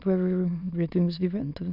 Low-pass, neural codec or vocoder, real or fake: 5.4 kHz; autoencoder, 22.05 kHz, a latent of 192 numbers a frame, VITS, trained on many speakers; fake